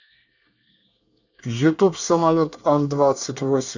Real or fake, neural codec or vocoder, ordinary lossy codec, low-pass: fake; codec, 24 kHz, 1 kbps, SNAC; none; 7.2 kHz